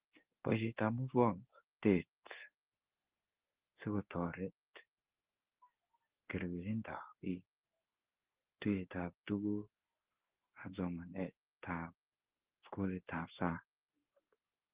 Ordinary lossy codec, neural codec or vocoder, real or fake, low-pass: Opus, 16 kbps; codec, 16 kHz in and 24 kHz out, 1 kbps, XY-Tokenizer; fake; 3.6 kHz